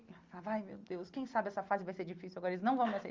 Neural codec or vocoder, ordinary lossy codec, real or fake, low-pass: none; Opus, 32 kbps; real; 7.2 kHz